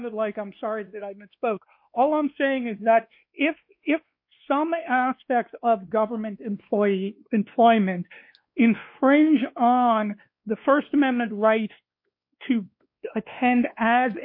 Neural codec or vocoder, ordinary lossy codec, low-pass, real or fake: codec, 16 kHz, 2 kbps, X-Codec, WavLM features, trained on Multilingual LibriSpeech; MP3, 32 kbps; 5.4 kHz; fake